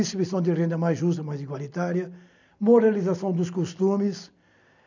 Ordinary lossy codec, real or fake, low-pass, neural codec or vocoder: none; real; 7.2 kHz; none